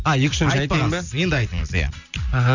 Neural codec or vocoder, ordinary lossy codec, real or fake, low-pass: none; none; real; 7.2 kHz